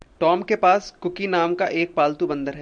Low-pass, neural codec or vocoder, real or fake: 9.9 kHz; none; real